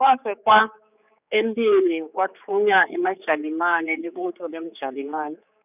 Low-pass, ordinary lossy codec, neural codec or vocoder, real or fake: 3.6 kHz; none; codec, 16 kHz, 4 kbps, X-Codec, HuBERT features, trained on general audio; fake